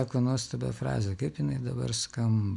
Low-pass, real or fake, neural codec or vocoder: 10.8 kHz; real; none